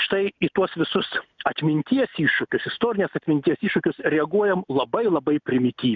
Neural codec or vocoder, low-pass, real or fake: none; 7.2 kHz; real